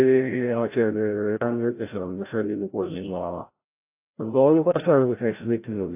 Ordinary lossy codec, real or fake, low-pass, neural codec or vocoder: AAC, 24 kbps; fake; 3.6 kHz; codec, 16 kHz, 0.5 kbps, FreqCodec, larger model